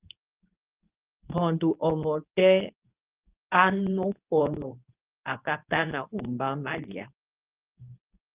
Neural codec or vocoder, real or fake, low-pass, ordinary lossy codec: codec, 24 kHz, 0.9 kbps, WavTokenizer, medium speech release version 2; fake; 3.6 kHz; Opus, 24 kbps